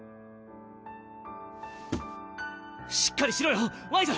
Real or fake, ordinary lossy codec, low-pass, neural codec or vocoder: real; none; none; none